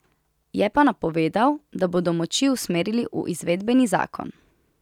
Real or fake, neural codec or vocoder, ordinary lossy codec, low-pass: real; none; none; 19.8 kHz